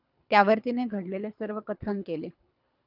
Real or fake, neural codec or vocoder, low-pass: fake; codec, 24 kHz, 3 kbps, HILCodec; 5.4 kHz